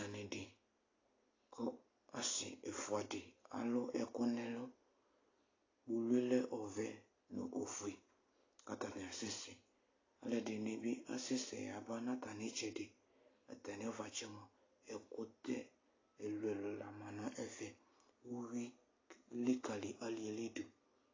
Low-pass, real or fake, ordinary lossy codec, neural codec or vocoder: 7.2 kHz; real; AAC, 32 kbps; none